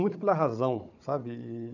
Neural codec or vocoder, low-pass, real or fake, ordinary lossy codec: codec, 16 kHz, 4 kbps, FunCodec, trained on Chinese and English, 50 frames a second; 7.2 kHz; fake; none